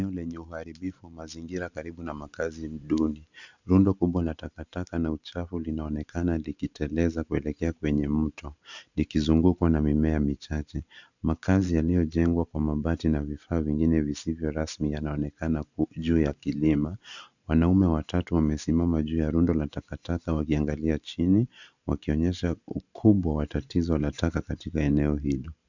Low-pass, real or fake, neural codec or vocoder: 7.2 kHz; fake; vocoder, 44.1 kHz, 128 mel bands every 512 samples, BigVGAN v2